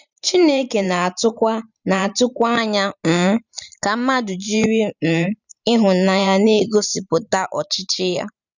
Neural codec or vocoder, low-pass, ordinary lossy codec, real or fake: vocoder, 44.1 kHz, 128 mel bands every 512 samples, BigVGAN v2; 7.2 kHz; none; fake